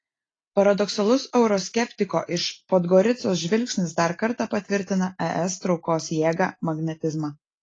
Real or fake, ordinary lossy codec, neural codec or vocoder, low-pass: real; AAC, 32 kbps; none; 9.9 kHz